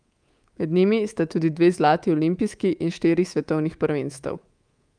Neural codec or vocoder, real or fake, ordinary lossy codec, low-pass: codec, 24 kHz, 3.1 kbps, DualCodec; fake; Opus, 32 kbps; 9.9 kHz